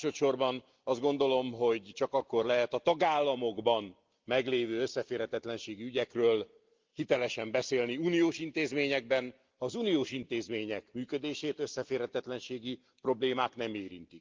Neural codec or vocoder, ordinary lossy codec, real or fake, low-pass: none; Opus, 32 kbps; real; 7.2 kHz